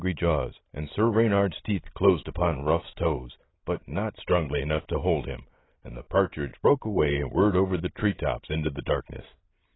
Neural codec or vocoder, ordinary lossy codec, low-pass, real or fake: none; AAC, 16 kbps; 7.2 kHz; real